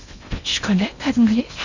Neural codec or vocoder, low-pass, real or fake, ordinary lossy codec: codec, 16 kHz in and 24 kHz out, 0.8 kbps, FocalCodec, streaming, 65536 codes; 7.2 kHz; fake; none